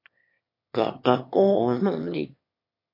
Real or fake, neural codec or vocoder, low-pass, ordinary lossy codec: fake; autoencoder, 22.05 kHz, a latent of 192 numbers a frame, VITS, trained on one speaker; 5.4 kHz; MP3, 32 kbps